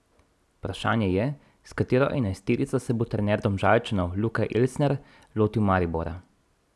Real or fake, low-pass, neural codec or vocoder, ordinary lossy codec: real; none; none; none